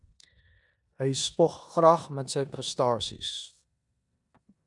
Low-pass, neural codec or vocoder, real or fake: 10.8 kHz; codec, 16 kHz in and 24 kHz out, 0.9 kbps, LongCat-Audio-Codec, four codebook decoder; fake